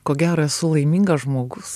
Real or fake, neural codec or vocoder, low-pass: real; none; 14.4 kHz